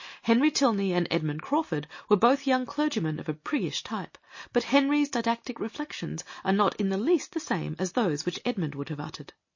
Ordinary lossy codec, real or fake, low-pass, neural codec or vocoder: MP3, 32 kbps; real; 7.2 kHz; none